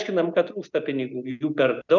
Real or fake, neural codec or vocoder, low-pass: real; none; 7.2 kHz